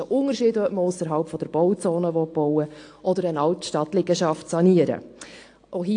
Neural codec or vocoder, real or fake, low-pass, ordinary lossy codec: none; real; 9.9 kHz; AAC, 48 kbps